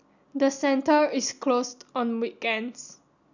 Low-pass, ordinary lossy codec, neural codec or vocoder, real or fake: 7.2 kHz; none; none; real